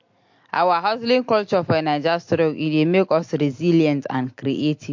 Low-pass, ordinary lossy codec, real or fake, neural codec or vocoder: 7.2 kHz; MP3, 48 kbps; real; none